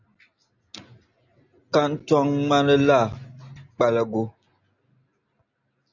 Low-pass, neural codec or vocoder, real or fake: 7.2 kHz; none; real